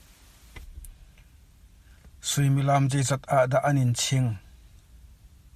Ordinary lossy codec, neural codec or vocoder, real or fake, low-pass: Opus, 64 kbps; none; real; 14.4 kHz